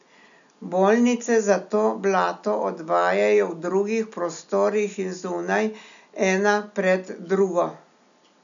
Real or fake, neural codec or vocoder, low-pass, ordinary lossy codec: real; none; 7.2 kHz; none